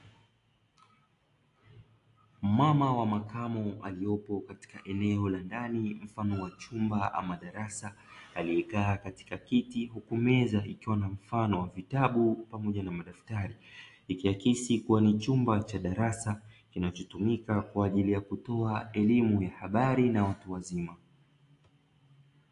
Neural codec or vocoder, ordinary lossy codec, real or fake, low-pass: none; AAC, 48 kbps; real; 10.8 kHz